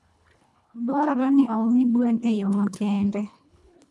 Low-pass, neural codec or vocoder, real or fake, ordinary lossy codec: none; codec, 24 kHz, 1.5 kbps, HILCodec; fake; none